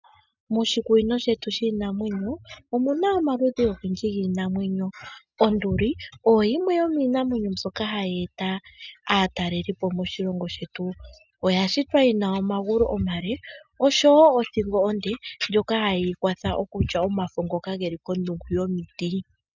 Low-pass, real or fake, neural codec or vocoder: 7.2 kHz; real; none